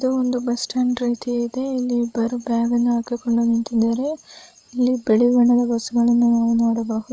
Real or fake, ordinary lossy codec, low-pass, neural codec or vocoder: fake; none; none; codec, 16 kHz, 16 kbps, FreqCodec, larger model